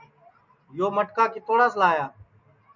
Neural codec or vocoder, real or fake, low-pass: none; real; 7.2 kHz